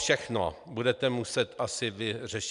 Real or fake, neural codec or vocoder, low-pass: real; none; 10.8 kHz